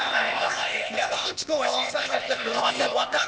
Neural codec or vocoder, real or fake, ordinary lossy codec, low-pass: codec, 16 kHz, 0.8 kbps, ZipCodec; fake; none; none